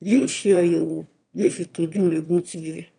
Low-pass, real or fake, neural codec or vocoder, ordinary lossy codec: 9.9 kHz; fake; autoencoder, 22.05 kHz, a latent of 192 numbers a frame, VITS, trained on one speaker; none